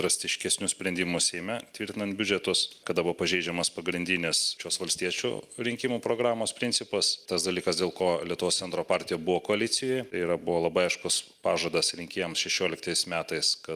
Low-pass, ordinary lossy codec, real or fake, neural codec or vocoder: 14.4 kHz; Opus, 32 kbps; real; none